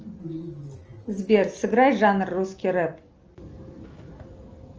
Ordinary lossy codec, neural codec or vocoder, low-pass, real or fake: Opus, 24 kbps; none; 7.2 kHz; real